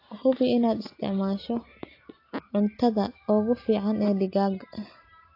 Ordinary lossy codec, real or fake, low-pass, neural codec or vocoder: AAC, 32 kbps; real; 5.4 kHz; none